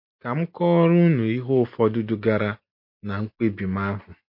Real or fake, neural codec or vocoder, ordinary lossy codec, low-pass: real; none; MP3, 32 kbps; 5.4 kHz